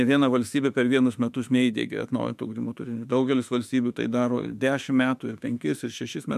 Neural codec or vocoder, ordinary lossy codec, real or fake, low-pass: autoencoder, 48 kHz, 32 numbers a frame, DAC-VAE, trained on Japanese speech; MP3, 96 kbps; fake; 14.4 kHz